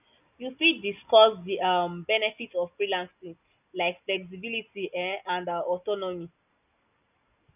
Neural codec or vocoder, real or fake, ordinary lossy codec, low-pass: none; real; none; 3.6 kHz